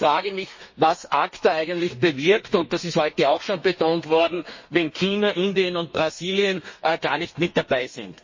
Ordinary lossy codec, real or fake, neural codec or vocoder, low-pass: MP3, 32 kbps; fake; codec, 32 kHz, 1.9 kbps, SNAC; 7.2 kHz